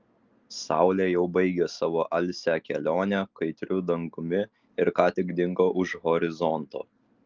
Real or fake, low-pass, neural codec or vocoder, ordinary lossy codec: real; 7.2 kHz; none; Opus, 32 kbps